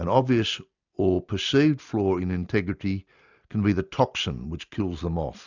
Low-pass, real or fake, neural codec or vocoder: 7.2 kHz; real; none